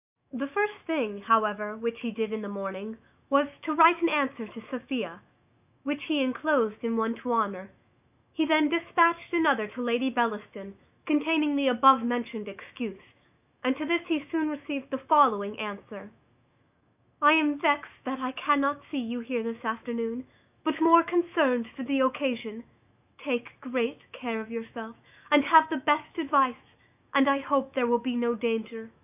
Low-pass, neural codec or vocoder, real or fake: 3.6 kHz; autoencoder, 48 kHz, 128 numbers a frame, DAC-VAE, trained on Japanese speech; fake